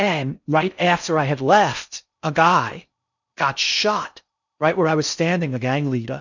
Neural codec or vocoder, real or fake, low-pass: codec, 16 kHz in and 24 kHz out, 0.6 kbps, FocalCodec, streaming, 4096 codes; fake; 7.2 kHz